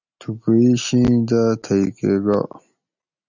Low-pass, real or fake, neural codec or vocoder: 7.2 kHz; real; none